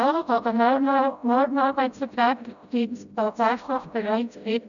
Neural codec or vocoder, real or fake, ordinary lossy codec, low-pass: codec, 16 kHz, 0.5 kbps, FreqCodec, smaller model; fake; none; 7.2 kHz